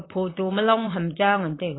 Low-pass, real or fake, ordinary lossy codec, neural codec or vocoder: 7.2 kHz; fake; AAC, 16 kbps; vocoder, 44.1 kHz, 128 mel bands every 512 samples, BigVGAN v2